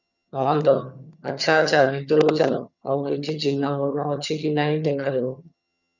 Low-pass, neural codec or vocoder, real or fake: 7.2 kHz; vocoder, 22.05 kHz, 80 mel bands, HiFi-GAN; fake